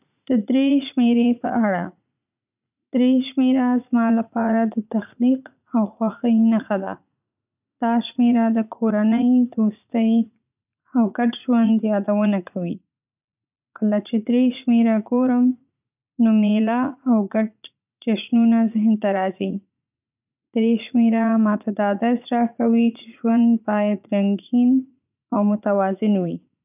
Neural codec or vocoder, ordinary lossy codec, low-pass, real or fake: vocoder, 44.1 kHz, 80 mel bands, Vocos; none; 3.6 kHz; fake